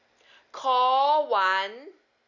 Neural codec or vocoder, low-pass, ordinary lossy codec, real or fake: none; 7.2 kHz; none; real